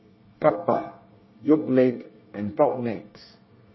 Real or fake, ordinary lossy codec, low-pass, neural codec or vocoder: fake; MP3, 24 kbps; 7.2 kHz; codec, 24 kHz, 1 kbps, SNAC